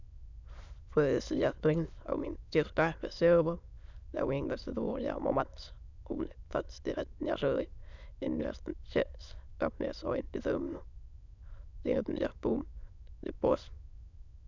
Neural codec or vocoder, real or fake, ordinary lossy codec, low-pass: autoencoder, 22.05 kHz, a latent of 192 numbers a frame, VITS, trained on many speakers; fake; none; 7.2 kHz